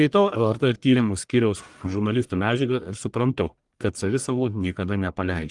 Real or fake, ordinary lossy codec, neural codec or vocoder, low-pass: fake; Opus, 24 kbps; codec, 44.1 kHz, 1.7 kbps, Pupu-Codec; 10.8 kHz